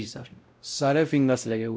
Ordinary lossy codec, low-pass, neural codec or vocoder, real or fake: none; none; codec, 16 kHz, 0.5 kbps, X-Codec, WavLM features, trained on Multilingual LibriSpeech; fake